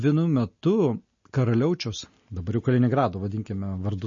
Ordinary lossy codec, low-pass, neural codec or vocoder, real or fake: MP3, 32 kbps; 7.2 kHz; none; real